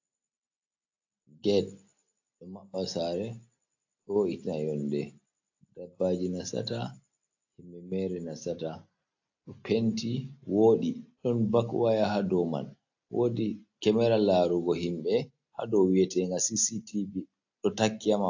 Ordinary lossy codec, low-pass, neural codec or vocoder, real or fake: AAC, 48 kbps; 7.2 kHz; none; real